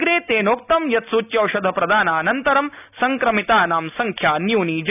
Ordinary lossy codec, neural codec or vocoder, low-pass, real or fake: none; none; 3.6 kHz; real